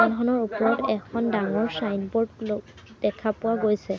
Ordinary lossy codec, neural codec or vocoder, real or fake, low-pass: none; none; real; none